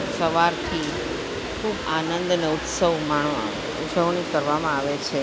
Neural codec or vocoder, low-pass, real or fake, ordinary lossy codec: none; none; real; none